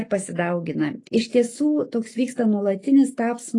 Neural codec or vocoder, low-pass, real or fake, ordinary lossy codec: autoencoder, 48 kHz, 128 numbers a frame, DAC-VAE, trained on Japanese speech; 10.8 kHz; fake; AAC, 32 kbps